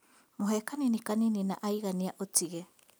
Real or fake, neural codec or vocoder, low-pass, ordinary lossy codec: real; none; none; none